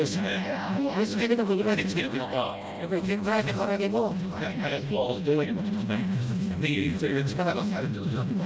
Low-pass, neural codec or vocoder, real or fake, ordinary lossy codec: none; codec, 16 kHz, 0.5 kbps, FreqCodec, smaller model; fake; none